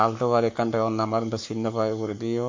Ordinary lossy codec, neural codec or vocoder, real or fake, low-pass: MP3, 48 kbps; codec, 44.1 kHz, 7.8 kbps, Pupu-Codec; fake; 7.2 kHz